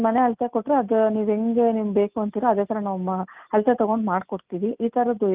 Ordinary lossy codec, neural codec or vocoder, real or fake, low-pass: Opus, 32 kbps; none; real; 3.6 kHz